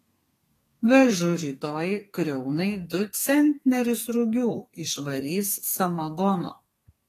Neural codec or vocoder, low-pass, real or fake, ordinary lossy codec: codec, 32 kHz, 1.9 kbps, SNAC; 14.4 kHz; fake; AAC, 48 kbps